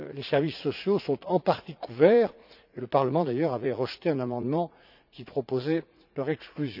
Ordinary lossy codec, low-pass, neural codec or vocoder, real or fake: none; 5.4 kHz; vocoder, 44.1 kHz, 80 mel bands, Vocos; fake